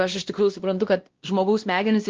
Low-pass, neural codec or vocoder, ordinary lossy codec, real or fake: 7.2 kHz; codec, 16 kHz, 1 kbps, X-Codec, WavLM features, trained on Multilingual LibriSpeech; Opus, 16 kbps; fake